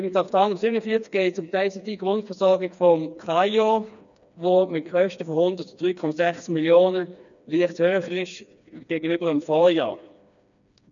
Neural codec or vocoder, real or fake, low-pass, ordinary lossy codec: codec, 16 kHz, 2 kbps, FreqCodec, smaller model; fake; 7.2 kHz; none